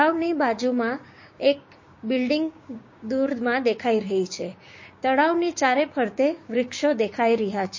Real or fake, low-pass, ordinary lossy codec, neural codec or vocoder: fake; 7.2 kHz; MP3, 32 kbps; codec, 16 kHz, 6 kbps, DAC